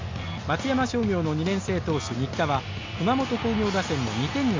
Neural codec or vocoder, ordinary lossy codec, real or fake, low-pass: none; MP3, 48 kbps; real; 7.2 kHz